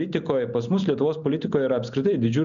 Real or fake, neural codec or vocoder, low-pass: real; none; 7.2 kHz